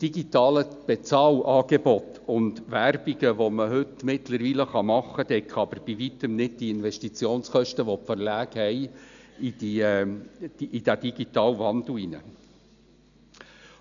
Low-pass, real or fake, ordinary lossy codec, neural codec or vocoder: 7.2 kHz; real; none; none